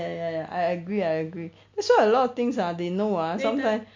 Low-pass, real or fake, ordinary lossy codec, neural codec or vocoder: 7.2 kHz; real; MP3, 48 kbps; none